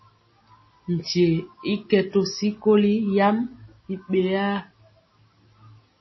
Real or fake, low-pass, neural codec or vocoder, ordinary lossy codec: real; 7.2 kHz; none; MP3, 24 kbps